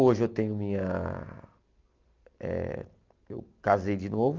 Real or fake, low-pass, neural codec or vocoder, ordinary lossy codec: real; 7.2 kHz; none; Opus, 32 kbps